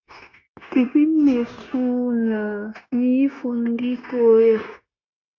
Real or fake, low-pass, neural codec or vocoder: fake; 7.2 kHz; codec, 16 kHz, 0.9 kbps, LongCat-Audio-Codec